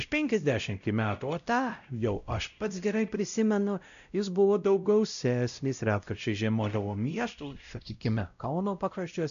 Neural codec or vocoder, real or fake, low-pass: codec, 16 kHz, 0.5 kbps, X-Codec, WavLM features, trained on Multilingual LibriSpeech; fake; 7.2 kHz